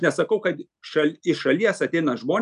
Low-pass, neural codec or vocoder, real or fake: 10.8 kHz; none; real